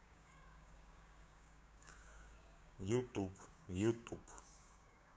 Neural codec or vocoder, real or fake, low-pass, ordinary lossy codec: codec, 16 kHz, 6 kbps, DAC; fake; none; none